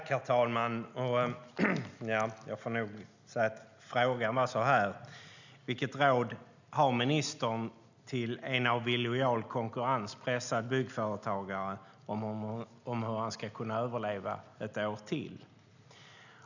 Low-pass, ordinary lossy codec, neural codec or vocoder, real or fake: 7.2 kHz; none; none; real